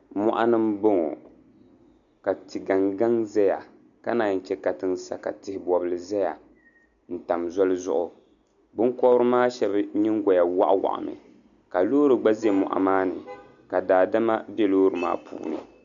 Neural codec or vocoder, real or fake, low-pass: none; real; 7.2 kHz